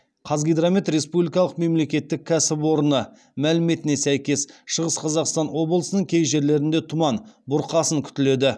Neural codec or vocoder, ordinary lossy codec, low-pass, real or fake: none; none; none; real